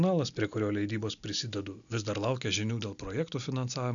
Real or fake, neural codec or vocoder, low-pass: real; none; 7.2 kHz